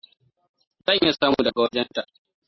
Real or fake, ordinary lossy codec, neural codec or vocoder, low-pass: real; MP3, 24 kbps; none; 7.2 kHz